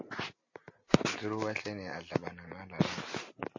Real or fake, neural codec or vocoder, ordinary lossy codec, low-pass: real; none; MP3, 32 kbps; 7.2 kHz